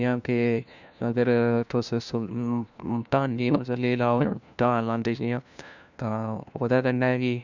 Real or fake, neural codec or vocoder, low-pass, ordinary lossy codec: fake; codec, 16 kHz, 1 kbps, FunCodec, trained on LibriTTS, 50 frames a second; 7.2 kHz; none